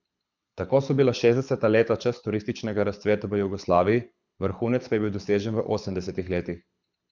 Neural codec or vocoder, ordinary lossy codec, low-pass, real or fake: codec, 24 kHz, 6 kbps, HILCodec; none; 7.2 kHz; fake